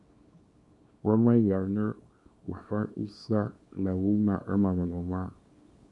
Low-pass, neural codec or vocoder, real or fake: 10.8 kHz; codec, 24 kHz, 0.9 kbps, WavTokenizer, small release; fake